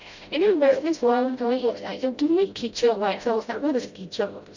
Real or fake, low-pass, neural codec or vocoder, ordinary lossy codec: fake; 7.2 kHz; codec, 16 kHz, 0.5 kbps, FreqCodec, smaller model; Opus, 64 kbps